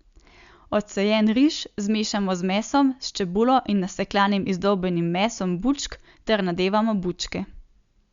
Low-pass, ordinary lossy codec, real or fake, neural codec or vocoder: 7.2 kHz; Opus, 64 kbps; real; none